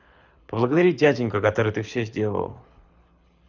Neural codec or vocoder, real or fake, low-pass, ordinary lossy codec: codec, 24 kHz, 6 kbps, HILCodec; fake; 7.2 kHz; none